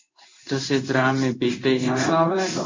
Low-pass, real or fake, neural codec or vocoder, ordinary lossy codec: 7.2 kHz; fake; codec, 16 kHz in and 24 kHz out, 1 kbps, XY-Tokenizer; MP3, 48 kbps